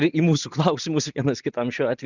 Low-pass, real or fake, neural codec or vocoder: 7.2 kHz; real; none